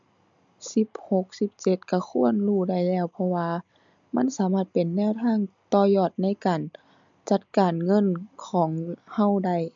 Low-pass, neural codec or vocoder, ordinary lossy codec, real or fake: 7.2 kHz; none; MP3, 64 kbps; real